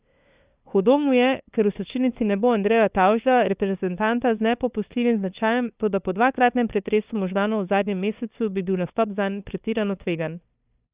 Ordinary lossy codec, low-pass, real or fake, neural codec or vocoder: Opus, 64 kbps; 3.6 kHz; fake; codec, 16 kHz, 2 kbps, FunCodec, trained on LibriTTS, 25 frames a second